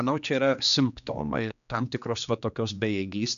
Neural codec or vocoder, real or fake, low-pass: codec, 16 kHz, 2 kbps, X-Codec, HuBERT features, trained on general audio; fake; 7.2 kHz